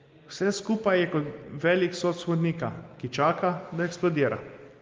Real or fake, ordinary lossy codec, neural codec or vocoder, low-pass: real; Opus, 32 kbps; none; 7.2 kHz